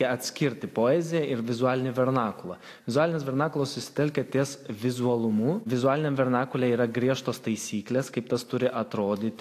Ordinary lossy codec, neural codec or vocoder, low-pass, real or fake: AAC, 64 kbps; none; 14.4 kHz; real